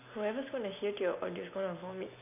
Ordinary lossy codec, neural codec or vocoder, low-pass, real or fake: none; none; 3.6 kHz; real